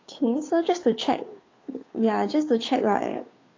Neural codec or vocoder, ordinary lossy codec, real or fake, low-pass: codec, 16 kHz, 2 kbps, FunCodec, trained on Chinese and English, 25 frames a second; AAC, 32 kbps; fake; 7.2 kHz